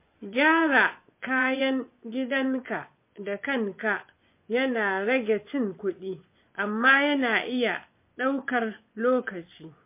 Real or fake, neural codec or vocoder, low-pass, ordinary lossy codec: fake; vocoder, 24 kHz, 100 mel bands, Vocos; 3.6 kHz; MP3, 24 kbps